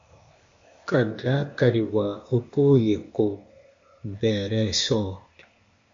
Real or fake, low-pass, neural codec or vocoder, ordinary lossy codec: fake; 7.2 kHz; codec, 16 kHz, 0.8 kbps, ZipCodec; MP3, 48 kbps